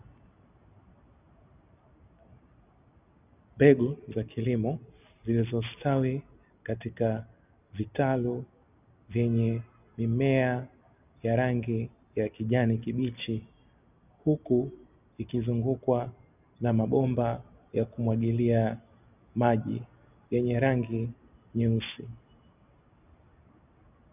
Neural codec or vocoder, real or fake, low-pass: none; real; 3.6 kHz